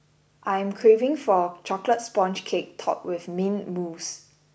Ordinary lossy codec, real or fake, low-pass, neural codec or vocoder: none; real; none; none